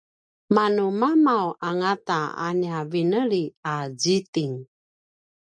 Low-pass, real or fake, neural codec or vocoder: 9.9 kHz; real; none